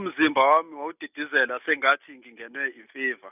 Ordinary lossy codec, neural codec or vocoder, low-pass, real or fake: none; none; 3.6 kHz; real